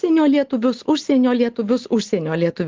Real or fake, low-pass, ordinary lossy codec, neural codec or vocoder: real; 7.2 kHz; Opus, 16 kbps; none